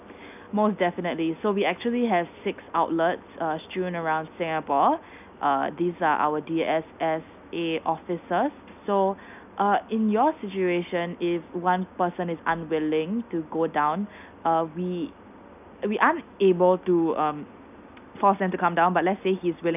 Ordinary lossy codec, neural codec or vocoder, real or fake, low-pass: none; none; real; 3.6 kHz